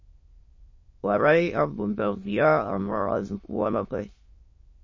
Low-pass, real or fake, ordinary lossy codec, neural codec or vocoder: 7.2 kHz; fake; MP3, 32 kbps; autoencoder, 22.05 kHz, a latent of 192 numbers a frame, VITS, trained on many speakers